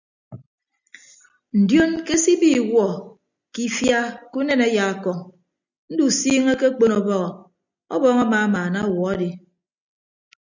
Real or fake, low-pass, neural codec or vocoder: real; 7.2 kHz; none